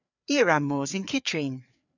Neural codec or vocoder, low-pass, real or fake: codec, 16 kHz, 4 kbps, FreqCodec, larger model; 7.2 kHz; fake